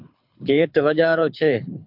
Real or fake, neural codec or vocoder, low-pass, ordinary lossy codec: fake; codec, 24 kHz, 6 kbps, HILCodec; 5.4 kHz; MP3, 48 kbps